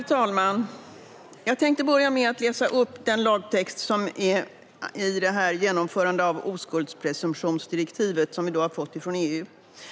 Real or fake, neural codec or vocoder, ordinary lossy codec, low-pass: real; none; none; none